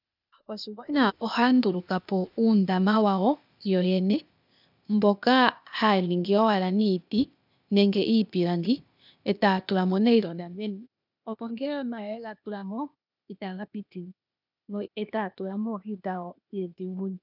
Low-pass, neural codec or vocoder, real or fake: 5.4 kHz; codec, 16 kHz, 0.8 kbps, ZipCodec; fake